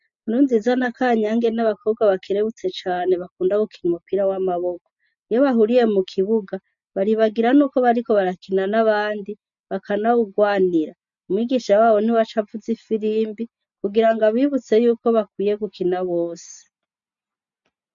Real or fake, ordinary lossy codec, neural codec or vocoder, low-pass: real; MP3, 64 kbps; none; 7.2 kHz